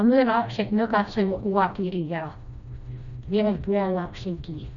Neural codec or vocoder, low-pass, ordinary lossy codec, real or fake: codec, 16 kHz, 1 kbps, FreqCodec, smaller model; 7.2 kHz; none; fake